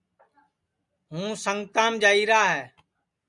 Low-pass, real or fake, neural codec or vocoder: 10.8 kHz; real; none